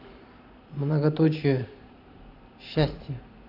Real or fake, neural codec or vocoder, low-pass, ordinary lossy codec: fake; vocoder, 44.1 kHz, 128 mel bands every 512 samples, BigVGAN v2; 5.4 kHz; none